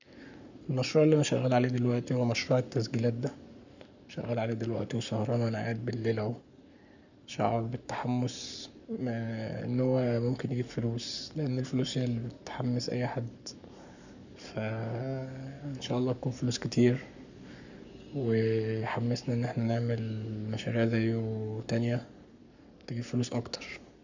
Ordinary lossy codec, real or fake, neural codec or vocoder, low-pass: none; fake; codec, 44.1 kHz, 7.8 kbps, Pupu-Codec; 7.2 kHz